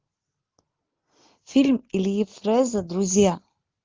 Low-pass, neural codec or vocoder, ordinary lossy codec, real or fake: 7.2 kHz; none; Opus, 16 kbps; real